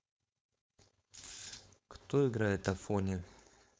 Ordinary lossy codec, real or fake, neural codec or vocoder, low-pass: none; fake; codec, 16 kHz, 4.8 kbps, FACodec; none